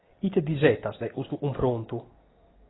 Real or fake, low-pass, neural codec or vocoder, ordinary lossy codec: real; 7.2 kHz; none; AAC, 16 kbps